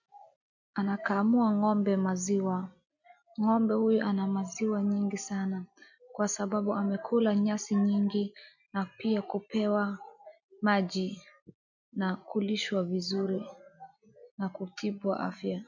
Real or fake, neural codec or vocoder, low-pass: real; none; 7.2 kHz